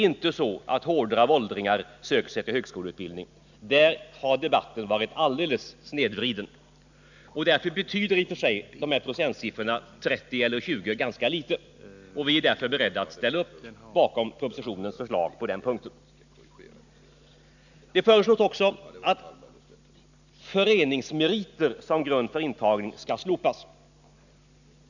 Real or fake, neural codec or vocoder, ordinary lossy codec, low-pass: real; none; none; 7.2 kHz